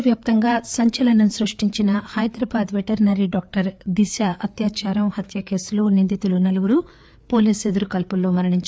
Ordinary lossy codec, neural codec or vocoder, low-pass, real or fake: none; codec, 16 kHz, 4 kbps, FreqCodec, larger model; none; fake